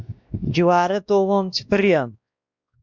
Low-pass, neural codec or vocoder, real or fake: 7.2 kHz; codec, 16 kHz, 1 kbps, X-Codec, WavLM features, trained on Multilingual LibriSpeech; fake